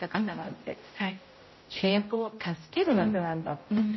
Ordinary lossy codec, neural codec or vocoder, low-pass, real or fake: MP3, 24 kbps; codec, 16 kHz, 0.5 kbps, X-Codec, HuBERT features, trained on general audio; 7.2 kHz; fake